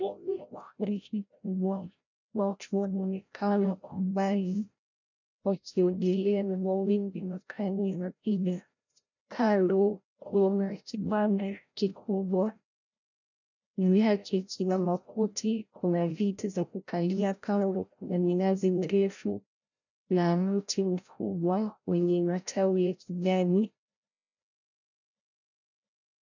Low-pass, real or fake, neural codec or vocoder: 7.2 kHz; fake; codec, 16 kHz, 0.5 kbps, FreqCodec, larger model